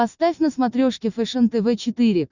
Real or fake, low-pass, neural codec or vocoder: real; 7.2 kHz; none